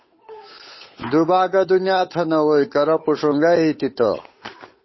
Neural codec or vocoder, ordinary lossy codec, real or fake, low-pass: codec, 16 kHz, 8 kbps, FunCodec, trained on Chinese and English, 25 frames a second; MP3, 24 kbps; fake; 7.2 kHz